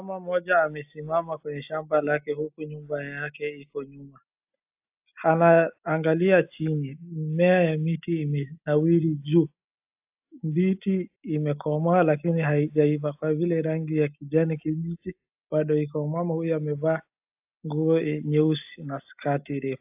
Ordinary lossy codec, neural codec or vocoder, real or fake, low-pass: AAC, 32 kbps; none; real; 3.6 kHz